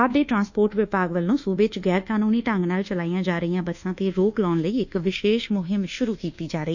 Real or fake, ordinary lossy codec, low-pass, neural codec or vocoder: fake; none; 7.2 kHz; codec, 24 kHz, 1.2 kbps, DualCodec